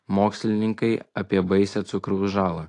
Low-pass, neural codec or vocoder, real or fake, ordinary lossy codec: 10.8 kHz; none; real; AAC, 48 kbps